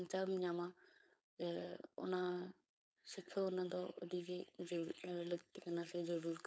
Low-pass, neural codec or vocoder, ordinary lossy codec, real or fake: none; codec, 16 kHz, 4.8 kbps, FACodec; none; fake